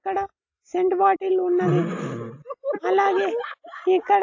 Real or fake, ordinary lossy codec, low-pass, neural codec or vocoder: real; AAC, 48 kbps; 7.2 kHz; none